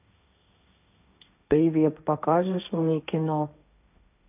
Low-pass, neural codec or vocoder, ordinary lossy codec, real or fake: 3.6 kHz; codec, 16 kHz, 1.1 kbps, Voila-Tokenizer; none; fake